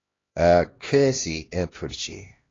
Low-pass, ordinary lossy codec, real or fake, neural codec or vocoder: 7.2 kHz; AAC, 32 kbps; fake; codec, 16 kHz, 1 kbps, X-Codec, HuBERT features, trained on LibriSpeech